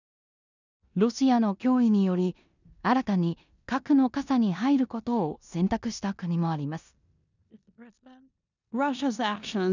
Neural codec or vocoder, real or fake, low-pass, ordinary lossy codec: codec, 16 kHz in and 24 kHz out, 0.9 kbps, LongCat-Audio-Codec, four codebook decoder; fake; 7.2 kHz; none